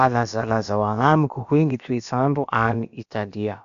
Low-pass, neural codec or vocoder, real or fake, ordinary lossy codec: 7.2 kHz; codec, 16 kHz, about 1 kbps, DyCAST, with the encoder's durations; fake; MP3, 96 kbps